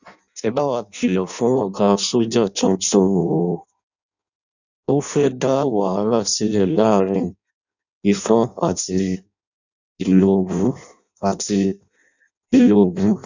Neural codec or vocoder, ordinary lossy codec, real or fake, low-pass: codec, 16 kHz in and 24 kHz out, 0.6 kbps, FireRedTTS-2 codec; none; fake; 7.2 kHz